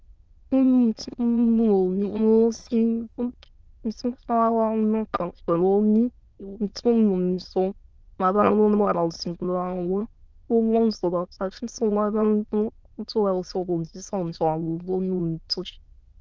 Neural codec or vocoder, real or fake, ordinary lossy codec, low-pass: autoencoder, 22.05 kHz, a latent of 192 numbers a frame, VITS, trained on many speakers; fake; Opus, 24 kbps; 7.2 kHz